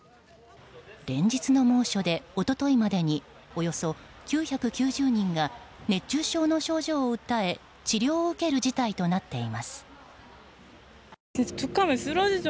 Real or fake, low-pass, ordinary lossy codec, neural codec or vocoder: real; none; none; none